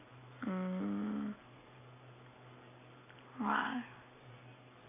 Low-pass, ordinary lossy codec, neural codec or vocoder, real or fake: 3.6 kHz; none; none; real